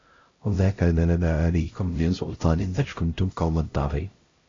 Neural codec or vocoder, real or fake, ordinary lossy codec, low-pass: codec, 16 kHz, 0.5 kbps, X-Codec, HuBERT features, trained on LibriSpeech; fake; AAC, 32 kbps; 7.2 kHz